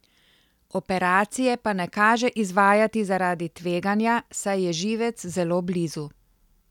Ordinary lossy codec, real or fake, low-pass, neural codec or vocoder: none; real; 19.8 kHz; none